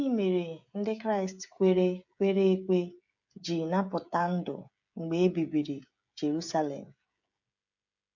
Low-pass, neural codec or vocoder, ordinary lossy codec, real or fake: 7.2 kHz; codec, 16 kHz, 16 kbps, FreqCodec, smaller model; none; fake